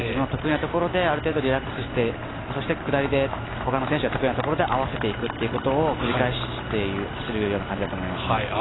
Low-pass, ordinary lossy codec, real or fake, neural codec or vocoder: 7.2 kHz; AAC, 16 kbps; real; none